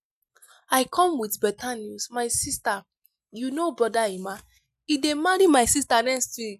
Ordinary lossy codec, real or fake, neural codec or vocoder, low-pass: none; real; none; 14.4 kHz